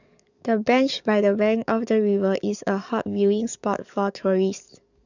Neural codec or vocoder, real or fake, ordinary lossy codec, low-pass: codec, 44.1 kHz, 7.8 kbps, DAC; fake; none; 7.2 kHz